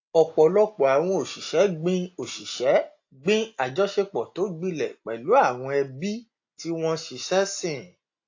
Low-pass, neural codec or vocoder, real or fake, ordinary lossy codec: 7.2 kHz; none; real; AAC, 48 kbps